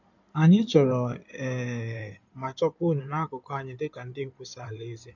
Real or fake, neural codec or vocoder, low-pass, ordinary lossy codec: fake; codec, 16 kHz in and 24 kHz out, 2.2 kbps, FireRedTTS-2 codec; 7.2 kHz; none